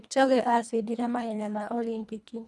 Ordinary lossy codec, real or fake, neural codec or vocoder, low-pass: none; fake; codec, 24 kHz, 1.5 kbps, HILCodec; none